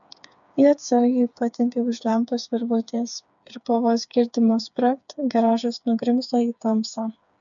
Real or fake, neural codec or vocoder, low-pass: fake; codec, 16 kHz, 4 kbps, FreqCodec, smaller model; 7.2 kHz